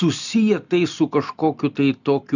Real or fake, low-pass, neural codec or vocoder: real; 7.2 kHz; none